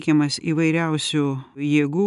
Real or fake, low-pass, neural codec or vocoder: real; 10.8 kHz; none